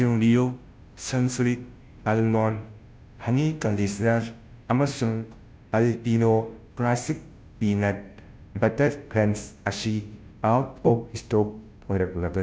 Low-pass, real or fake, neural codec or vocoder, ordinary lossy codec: none; fake; codec, 16 kHz, 0.5 kbps, FunCodec, trained on Chinese and English, 25 frames a second; none